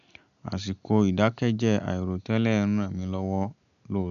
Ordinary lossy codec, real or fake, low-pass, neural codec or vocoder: none; real; 7.2 kHz; none